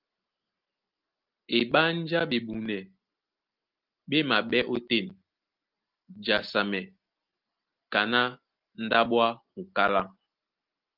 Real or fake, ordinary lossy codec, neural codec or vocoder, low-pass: real; Opus, 32 kbps; none; 5.4 kHz